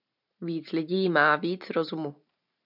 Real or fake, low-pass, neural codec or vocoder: fake; 5.4 kHz; vocoder, 44.1 kHz, 128 mel bands every 512 samples, BigVGAN v2